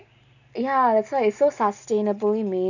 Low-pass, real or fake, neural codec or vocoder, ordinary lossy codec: 7.2 kHz; real; none; none